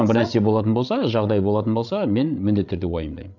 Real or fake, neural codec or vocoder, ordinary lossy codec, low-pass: fake; codec, 16 kHz, 8 kbps, FreqCodec, larger model; none; 7.2 kHz